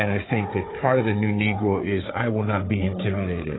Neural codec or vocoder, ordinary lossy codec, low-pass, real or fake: codec, 16 kHz, 4 kbps, FunCodec, trained on Chinese and English, 50 frames a second; AAC, 16 kbps; 7.2 kHz; fake